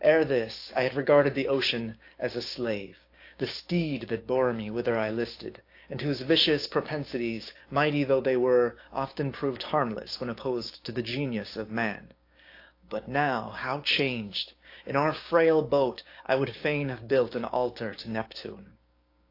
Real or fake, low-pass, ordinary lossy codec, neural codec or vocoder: fake; 5.4 kHz; AAC, 32 kbps; codec, 16 kHz, 6 kbps, DAC